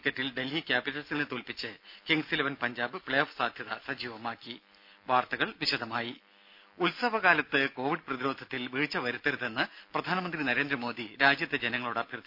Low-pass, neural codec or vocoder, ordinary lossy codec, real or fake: 5.4 kHz; none; none; real